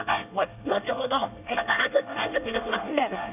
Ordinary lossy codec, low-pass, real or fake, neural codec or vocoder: none; 3.6 kHz; fake; codec, 24 kHz, 1 kbps, SNAC